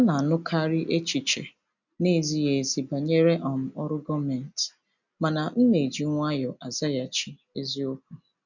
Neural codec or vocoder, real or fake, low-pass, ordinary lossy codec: none; real; 7.2 kHz; none